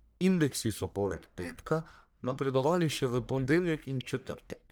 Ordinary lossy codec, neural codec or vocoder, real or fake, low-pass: none; codec, 44.1 kHz, 1.7 kbps, Pupu-Codec; fake; none